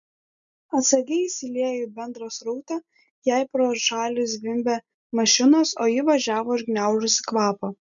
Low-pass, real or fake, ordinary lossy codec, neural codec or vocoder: 7.2 kHz; real; MP3, 96 kbps; none